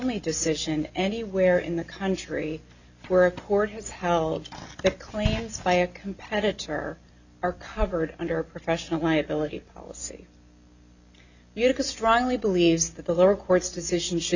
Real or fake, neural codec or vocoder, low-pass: real; none; 7.2 kHz